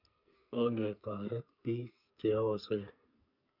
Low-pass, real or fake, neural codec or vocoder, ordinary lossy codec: 5.4 kHz; fake; codec, 44.1 kHz, 2.6 kbps, SNAC; none